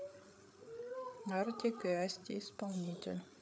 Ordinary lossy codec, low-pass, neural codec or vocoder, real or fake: none; none; codec, 16 kHz, 8 kbps, FreqCodec, larger model; fake